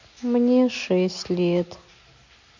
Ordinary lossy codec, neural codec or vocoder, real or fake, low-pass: MP3, 48 kbps; none; real; 7.2 kHz